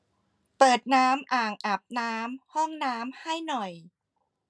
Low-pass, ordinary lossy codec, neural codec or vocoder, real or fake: none; none; none; real